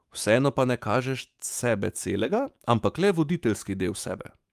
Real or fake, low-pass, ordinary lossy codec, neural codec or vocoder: fake; 14.4 kHz; Opus, 32 kbps; autoencoder, 48 kHz, 128 numbers a frame, DAC-VAE, trained on Japanese speech